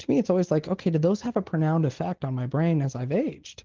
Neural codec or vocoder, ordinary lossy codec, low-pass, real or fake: none; Opus, 16 kbps; 7.2 kHz; real